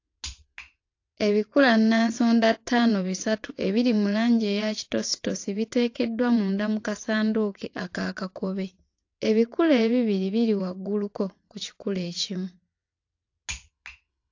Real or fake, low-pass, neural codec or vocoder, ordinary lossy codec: fake; 7.2 kHz; vocoder, 22.05 kHz, 80 mel bands, WaveNeXt; AAC, 48 kbps